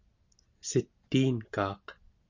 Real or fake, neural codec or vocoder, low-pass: real; none; 7.2 kHz